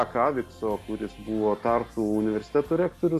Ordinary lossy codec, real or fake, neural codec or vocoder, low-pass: AAC, 64 kbps; real; none; 14.4 kHz